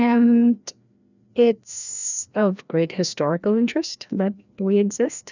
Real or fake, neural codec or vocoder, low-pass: fake; codec, 16 kHz, 1 kbps, FreqCodec, larger model; 7.2 kHz